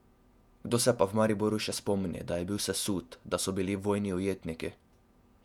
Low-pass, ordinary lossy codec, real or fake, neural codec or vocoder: 19.8 kHz; none; fake; vocoder, 48 kHz, 128 mel bands, Vocos